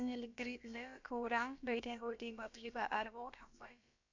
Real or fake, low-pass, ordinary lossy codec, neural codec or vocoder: fake; 7.2 kHz; MP3, 64 kbps; codec, 16 kHz, about 1 kbps, DyCAST, with the encoder's durations